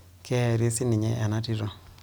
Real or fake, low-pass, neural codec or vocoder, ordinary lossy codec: real; none; none; none